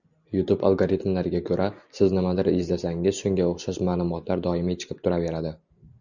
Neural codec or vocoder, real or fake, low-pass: none; real; 7.2 kHz